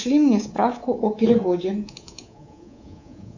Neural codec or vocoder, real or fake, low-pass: codec, 24 kHz, 3.1 kbps, DualCodec; fake; 7.2 kHz